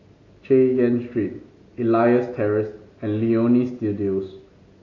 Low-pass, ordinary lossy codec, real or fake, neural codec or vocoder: 7.2 kHz; AAC, 32 kbps; real; none